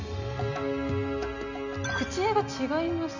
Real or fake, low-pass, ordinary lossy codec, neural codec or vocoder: real; 7.2 kHz; none; none